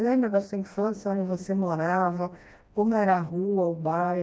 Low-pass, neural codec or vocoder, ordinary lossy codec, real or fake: none; codec, 16 kHz, 1 kbps, FreqCodec, smaller model; none; fake